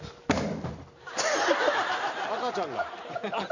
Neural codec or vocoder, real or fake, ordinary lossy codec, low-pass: none; real; none; 7.2 kHz